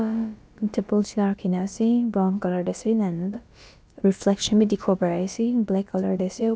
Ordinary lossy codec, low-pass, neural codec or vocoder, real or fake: none; none; codec, 16 kHz, about 1 kbps, DyCAST, with the encoder's durations; fake